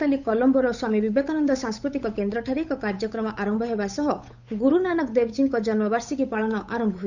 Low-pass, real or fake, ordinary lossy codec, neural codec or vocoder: 7.2 kHz; fake; none; codec, 16 kHz, 8 kbps, FunCodec, trained on Chinese and English, 25 frames a second